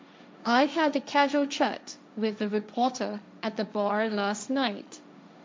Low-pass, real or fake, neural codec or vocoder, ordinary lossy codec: none; fake; codec, 16 kHz, 1.1 kbps, Voila-Tokenizer; none